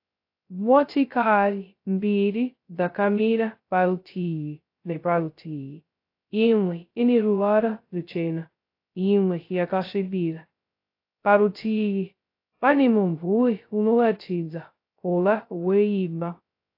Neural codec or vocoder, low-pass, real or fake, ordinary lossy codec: codec, 16 kHz, 0.2 kbps, FocalCodec; 5.4 kHz; fake; AAC, 32 kbps